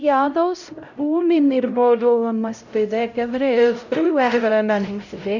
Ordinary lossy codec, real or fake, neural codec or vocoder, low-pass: none; fake; codec, 16 kHz, 0.5 kbps, X-Codec, HuBERT features, trained on LibriSpeech; 7.2 kHz